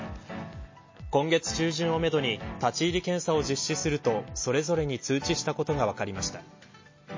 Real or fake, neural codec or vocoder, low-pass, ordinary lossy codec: real; none; 7.2 kHz; MP3, 32 kbps